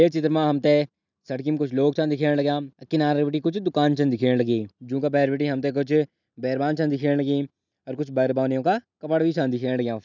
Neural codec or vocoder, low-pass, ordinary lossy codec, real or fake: none; 7.2 kHz; none; real